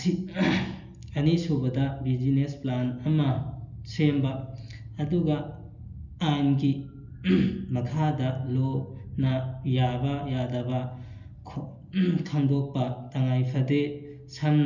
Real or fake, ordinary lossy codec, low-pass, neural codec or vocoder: real; none; 7.2 kHz; none